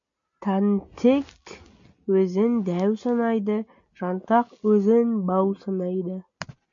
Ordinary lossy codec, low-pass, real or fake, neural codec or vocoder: MP3, 64 kbps; 7.2 kHz; real; none